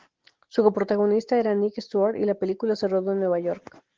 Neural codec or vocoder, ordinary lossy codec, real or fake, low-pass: none; Opus, 16 kbps; real; 7.2 kHz